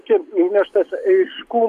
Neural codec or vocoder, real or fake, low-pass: none; real; 14.4 kHz